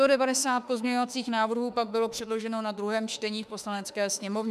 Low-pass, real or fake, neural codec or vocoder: 14.4 kHz; fake; autoencoder, 48 kHz, 32 numbers a frame, DAC-VAE, trained on Japanese speech